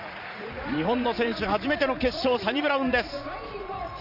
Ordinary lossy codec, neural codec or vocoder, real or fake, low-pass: none; vocoder, 44.1 kHz, 80 mel bands, Vocos; fake; 5.4 kHz